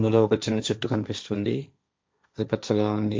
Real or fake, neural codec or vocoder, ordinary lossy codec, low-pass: fake; codec, 16 kHz, 1.1 kbps, Voila-Tokenizer; none; none